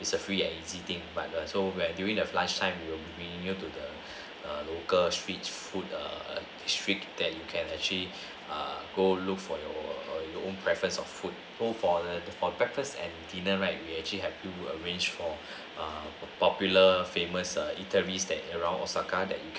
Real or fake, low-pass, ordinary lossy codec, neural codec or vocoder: real; none; none; none